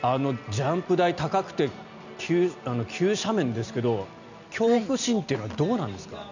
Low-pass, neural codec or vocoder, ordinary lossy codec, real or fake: 7.2 kHz; none; none; real